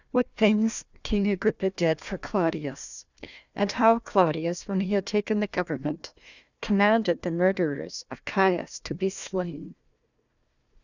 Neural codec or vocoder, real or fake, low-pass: codec, 16 kHz, 1 kbps, FunCodec, trained on Chinese and English, 50 frames a second; fake; 7.2 kHz